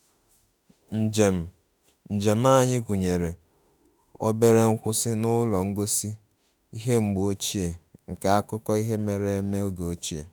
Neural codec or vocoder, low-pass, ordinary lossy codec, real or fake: autoencoder, 48 kHz, 32 numbers a frame, DAC-VAE, trained on Japanese speech; none; none; fake